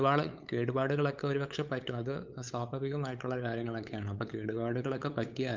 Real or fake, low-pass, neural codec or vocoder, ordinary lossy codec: fake; 7.2 kHz; codec, 16 kHz, 8 kbps, FunCodec, trained on LibriTTS, 25 frames a second; Opus, 24 kbps